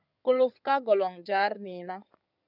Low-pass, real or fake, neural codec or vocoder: 5.4 kHz; fake; codec, 16 kHz in and 24 kHz out, 2.2 kbps, FireRedTTS-2 codec